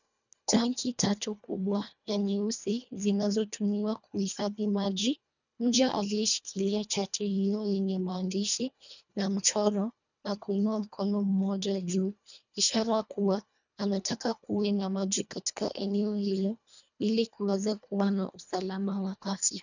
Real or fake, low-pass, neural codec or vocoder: fake; 7.2 kHz; codec, 24 kHz, 1.5 kbps, HILCodec